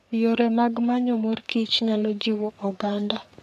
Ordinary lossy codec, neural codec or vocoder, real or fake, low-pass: none; codec, 44.1 kHz, 3.4 kbps, Pupu-Codec; fake; 14.4 kHz